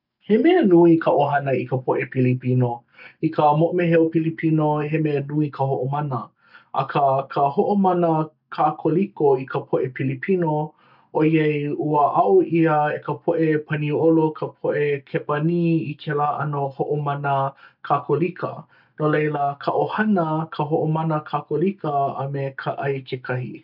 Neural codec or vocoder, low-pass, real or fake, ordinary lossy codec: none; 5.4 kHz; real; none